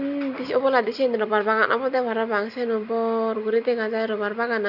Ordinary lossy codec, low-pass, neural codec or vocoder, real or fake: none; 5.4 kHz; none; real